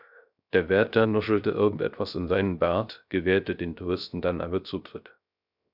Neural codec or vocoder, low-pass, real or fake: codec, 16 kHz, 0.3 kbps, FocalCodec; 5.4 kHz; fake